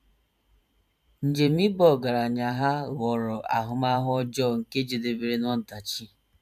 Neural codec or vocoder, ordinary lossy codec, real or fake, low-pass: vocoder, 44.1 kHz, 128 mel bands every 512 samples, BigVGAN v2; none; fake; 14.4 kHz